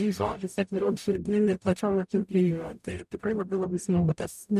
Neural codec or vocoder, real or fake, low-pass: codec, 44.1 kHz, 0.9 kbps, DAC; fake; 14.4 kHz